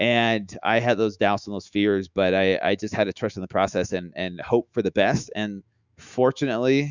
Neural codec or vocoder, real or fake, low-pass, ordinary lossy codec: autoencoder, 48 kHz, 128 numbers a frame, DAC-VAE, trained on Japanese speech; fake; 7.2 kHz; Opus, 64 kbps